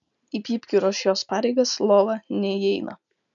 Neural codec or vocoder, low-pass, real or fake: none; 7.2 kHz; real